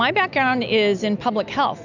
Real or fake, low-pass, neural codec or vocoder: real; 7.2 kHz; none